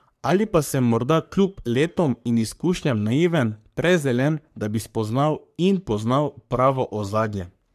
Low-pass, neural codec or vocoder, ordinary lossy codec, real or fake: 14.4 kHz; codec, 44.1 kHz, 3.4 kbps, Pupu-Codec; AAC, 96 kbps; fake